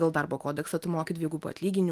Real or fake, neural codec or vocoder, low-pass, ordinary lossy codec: real; none; 14.4 kHz; Opus, 32 kbps